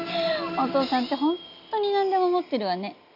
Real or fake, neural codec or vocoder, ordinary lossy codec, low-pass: real; none; none; 5.4 kHz